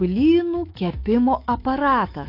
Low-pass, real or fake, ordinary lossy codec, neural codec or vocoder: 5.4 kHz; fake; AAC, 32 kbps; autoencoder, 48 kHz, 128 numbers a frame, DAC-VAE, trained on Japanese speech